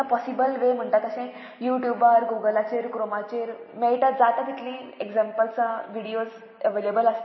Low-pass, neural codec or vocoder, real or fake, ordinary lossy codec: 7.2 kHz; none; real; MP3, 24 kbps